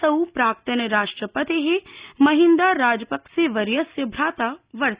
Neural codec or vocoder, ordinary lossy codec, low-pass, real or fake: none; Opus, 64 kbps; 3.6 kHz; real